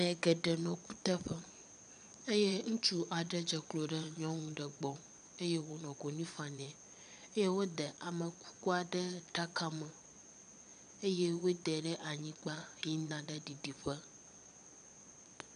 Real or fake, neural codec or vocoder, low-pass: fake; vocoder, 22.05 kHz, 80 mel bands, Vocos; 9.9 kHz